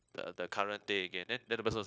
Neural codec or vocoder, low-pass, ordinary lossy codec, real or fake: codec, 16 kHz, 0.9 kbps, LongCat-Audio-Codec; none; none; fake